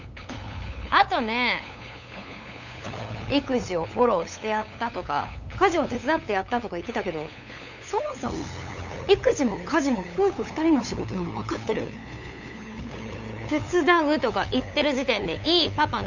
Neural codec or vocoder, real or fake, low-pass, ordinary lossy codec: codec, 16 kHz, 8 kbps, FunCodec, trained on LibriTTS, 25 frames a second; fake; 7.2 kHz; none